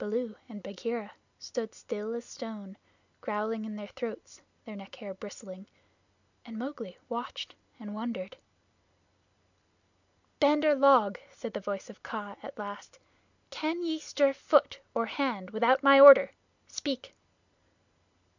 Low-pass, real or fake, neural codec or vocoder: 7.2 kHz; real; none